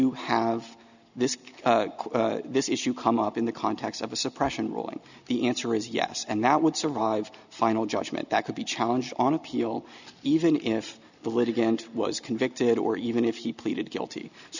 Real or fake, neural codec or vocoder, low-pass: real; none; 7.2 kHz